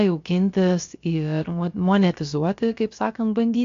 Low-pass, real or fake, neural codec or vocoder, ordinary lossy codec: 7.2 kHz; fake; codec, 16 kHz, about 1 kbps, DyCAST, with the encoder's durations; AAC, 48 kbps